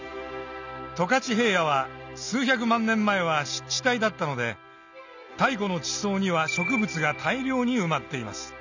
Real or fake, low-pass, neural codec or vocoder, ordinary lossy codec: real; 7.2 kHz; none; none